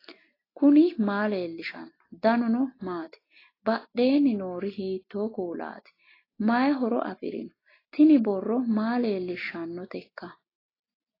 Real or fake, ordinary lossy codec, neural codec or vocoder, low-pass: real; AAC, 24 kbps; none; 5.4 kHz